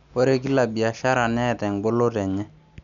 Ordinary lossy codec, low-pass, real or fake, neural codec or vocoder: none; 7.2 kHz; real; none